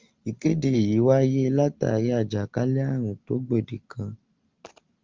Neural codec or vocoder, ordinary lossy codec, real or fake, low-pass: none; Opus, 32 kbps; real; 7.2 kHz